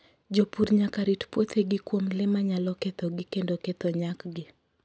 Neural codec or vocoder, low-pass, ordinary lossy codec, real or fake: none; none; none; real